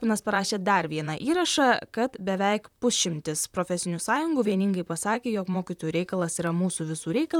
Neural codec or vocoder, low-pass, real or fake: vocoder, 44.1 kHz, 128 mel bands, Pupu-Vocoder; 19.8 kHz; fake